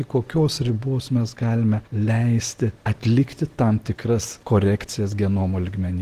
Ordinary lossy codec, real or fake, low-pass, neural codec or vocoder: Opus, 16 kbps; real; 14.4 kHz; none